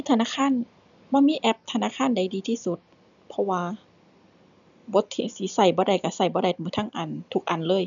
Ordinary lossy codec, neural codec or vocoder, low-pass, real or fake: none; none; 7.2 kHz; real